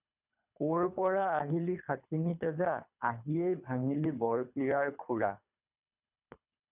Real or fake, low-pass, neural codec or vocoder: fake; 3.6 kHz; codec, 24 kHz, 3 kbps, HILCodec